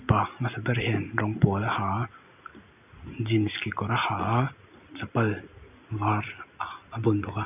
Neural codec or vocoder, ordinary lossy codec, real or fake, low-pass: none; none; real; 3.6 kHz